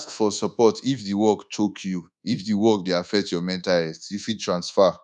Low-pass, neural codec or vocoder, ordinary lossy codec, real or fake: 10.8 kHz; codec, 24 kHz, 1.2 kbps, DualCodec; none; fake